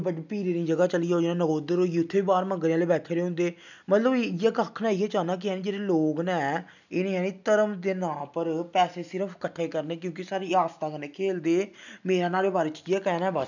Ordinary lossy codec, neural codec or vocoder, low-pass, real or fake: none; none; 7.2 kHz; real